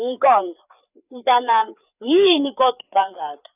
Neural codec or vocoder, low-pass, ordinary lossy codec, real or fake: codec, 16 kHz, 4 kbps, FreqCodec, larger model; 3.6 kHz; none; fake